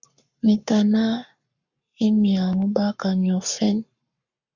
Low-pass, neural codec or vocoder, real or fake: 7.2 kHz; codec, 44.1 kHz, 7.8 kbps, Pupu-Codec; fake